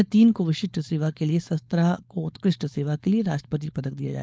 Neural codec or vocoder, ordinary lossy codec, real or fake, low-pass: codec, 16 kHz, 4.8 kbps, FACodec; none; fake; none